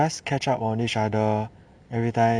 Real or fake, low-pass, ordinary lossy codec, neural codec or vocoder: fake; 9.9 kHz; none; vocoder, 44.1 kHz, 128 mel bands every 256 samples, BigVGAN v2